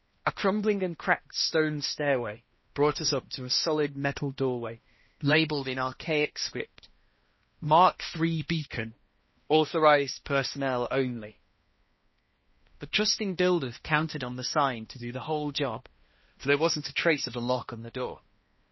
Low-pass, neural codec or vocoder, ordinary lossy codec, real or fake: 7.2 kHz; codec, 16 kHz, 1 kbps, X-Codec, HuBERT features, trained on balanced general audio; MP3, 24 kbps; fake